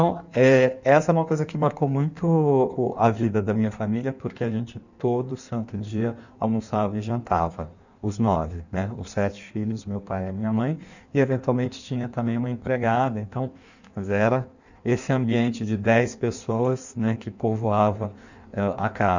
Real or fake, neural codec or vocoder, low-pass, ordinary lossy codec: fake; codec, 16 kHz in and 24 kHz out, 1.1 kbps, FireRedTTS-2 codec; 7.2 kHz; none